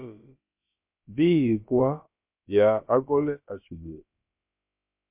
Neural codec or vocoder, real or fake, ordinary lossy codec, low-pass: codec, 16 kHz, about 1 kbps, DyCAST, with the encoder's durations; fake; MP3, 32 kbps; 3.6 kHz